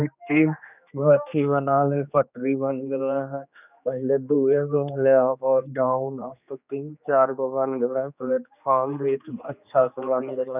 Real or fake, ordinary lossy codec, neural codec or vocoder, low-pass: fake; none; codec, 16 kHz, 2 kbps, X-Codec, HuBERT features, trained on general audio; 3.6 kHz